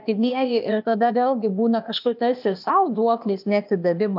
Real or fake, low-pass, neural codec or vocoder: fake; 5.4 kHz; codec, 16 kHz, 0.8 kbps, ZipCodec